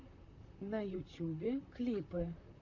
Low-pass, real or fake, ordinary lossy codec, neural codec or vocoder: 7.2 kHz; fake; MP3, 64 kbps; vocoder, 44.1 kHz, 128 mel bands, Pupu-Vocoder